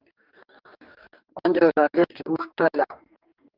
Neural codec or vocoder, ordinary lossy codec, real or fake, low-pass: codec, 44.1 kHz, 2.6 kbps, DAC; Opus, 32 kbps; fake; 5.4 kHz